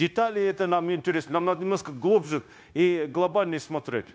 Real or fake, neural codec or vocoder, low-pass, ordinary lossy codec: fake; codec, 16 kHz, 0.9 kbps, LongCat-Audio-Codec; none; none